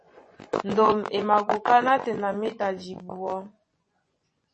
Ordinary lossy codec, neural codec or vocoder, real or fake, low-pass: MP3, 32 kbps; vocoder, 24 kHz, 100 mel bands, Vocos; fake; 10.8 kHz